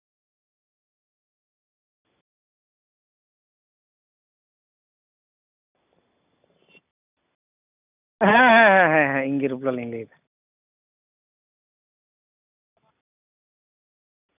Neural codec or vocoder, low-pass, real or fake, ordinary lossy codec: none; 3.6 kHz; real; none